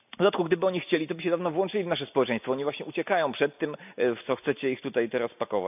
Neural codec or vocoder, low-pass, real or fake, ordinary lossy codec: none; 3.6 kHz; real; none